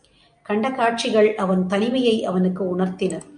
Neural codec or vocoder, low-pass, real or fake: none; 9.9 kHz; real